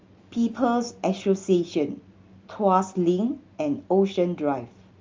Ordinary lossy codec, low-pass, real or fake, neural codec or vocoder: Opus, 32 kbps; 7.2 kHz; real; none